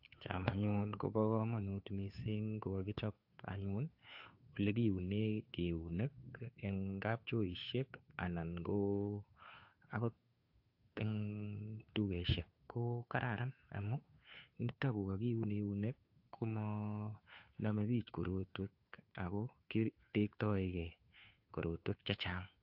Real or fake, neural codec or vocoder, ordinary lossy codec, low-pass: fake; codec, 16 kHz, 2 kbps, FunCodec, trained on Chinese and English, 25 frames a second; AAC, 48 kbps; 5.4 kHz